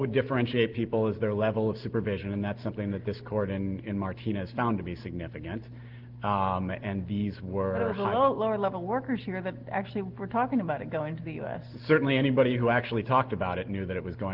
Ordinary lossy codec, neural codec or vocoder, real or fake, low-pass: Opus, 16 kbps; none; real; 5.4 kHz